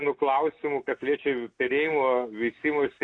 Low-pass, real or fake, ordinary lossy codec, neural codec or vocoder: 9.9 kHz; real; AAC, 48 kbps; none